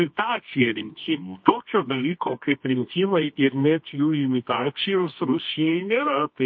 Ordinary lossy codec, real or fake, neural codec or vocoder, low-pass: MP3, 32 kbps; fake; codec, 24 kHz, 0.9 kbps, WavTokenizer, medium music audio release; 7.2 kHz